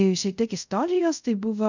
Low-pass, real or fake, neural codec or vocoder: 7.2 kHz; fake; codec, 24 kHz, 0.5 kbps, DualCodec